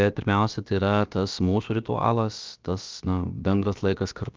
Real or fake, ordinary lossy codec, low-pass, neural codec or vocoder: fake; Opus, 24 kbps; 7.2 kHz; codec, 16 kHz, about 1 kbps, DyCAST, with the encoder's durations